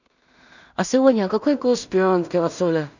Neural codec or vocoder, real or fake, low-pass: codec, 16 kHz in and 24 kHz out, 0.4 kbps, LongCat-Audio-Codec, two codebook decoder; fake; 7.2 kHz